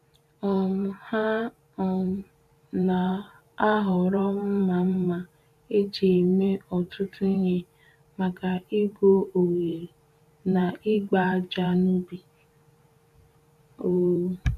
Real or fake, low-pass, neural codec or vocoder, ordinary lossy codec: fake; 14.4 kHz; vocoder, 44.1 kHz, 128 mel bands every 512 samples, BigVGAN v2; none